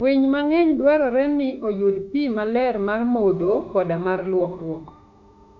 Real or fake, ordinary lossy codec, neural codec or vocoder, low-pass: fake; none; autoencoder, 48 kHz, 32 numbers a frame, DAC-VAE, trained on Japanese speech; 7.2 kHz